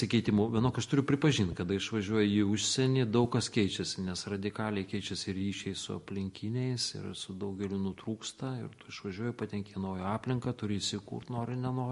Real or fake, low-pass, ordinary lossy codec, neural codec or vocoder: real; 14.4 kHz; MP3, 48 kbps; none